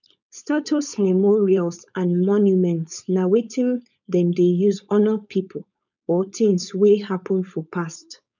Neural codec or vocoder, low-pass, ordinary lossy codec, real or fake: codec, 16 kHz, 4.8 kbps, FACodec; 7.2 kHz; none; fake